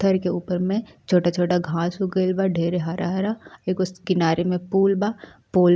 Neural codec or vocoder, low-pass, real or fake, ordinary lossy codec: none; none; real; none